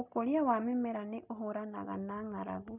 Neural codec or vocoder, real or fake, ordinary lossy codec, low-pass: none; real; none; 3.6 kHz